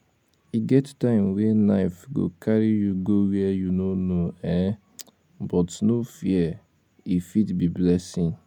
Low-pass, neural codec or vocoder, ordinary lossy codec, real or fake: 19.8 kHz; none; none; real